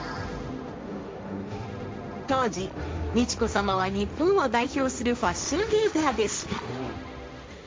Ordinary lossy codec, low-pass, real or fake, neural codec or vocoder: none; none; fake; codec, 16 kHz, 1.1 kbps, Voila-Tokenizer